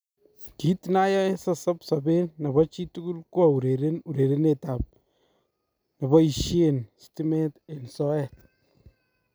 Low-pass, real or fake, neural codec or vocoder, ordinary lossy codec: none; real; none; none